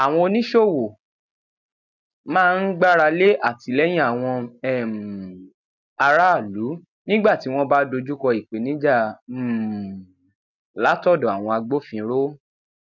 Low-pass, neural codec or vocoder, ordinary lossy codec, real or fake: 7.2 kHz; none; none; real